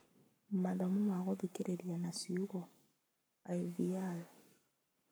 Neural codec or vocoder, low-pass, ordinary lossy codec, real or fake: codec, 44.1 kHz, 7.8 kbps, Pupu-Codec; none; none; fake